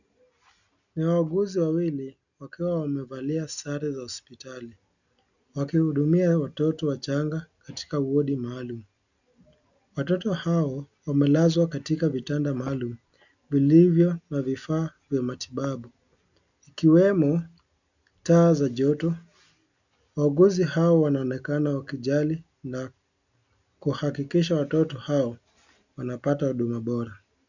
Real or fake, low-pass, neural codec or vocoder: real; 7.2 kHz; none